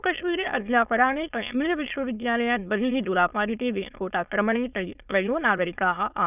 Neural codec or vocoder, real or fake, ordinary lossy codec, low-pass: autoencoder, 22.05 kHz, a latent of 192 numbers a frame, VITS, trained on many speakers; fake; none; 3.6 kHz